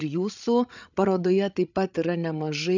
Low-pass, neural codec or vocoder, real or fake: 7.2 kHz; codec, 16 kHz, 8 kbps, FreqCodec, larger model; fake